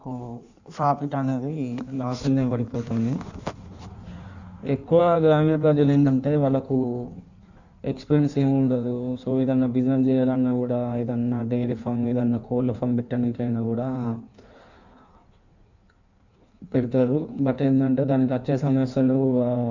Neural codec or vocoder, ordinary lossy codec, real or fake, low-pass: codec, 16 kHz in and 24 kHz out, 1.1 kbps, FireRedTTS-2 codec; none; fake; 7.2 kHz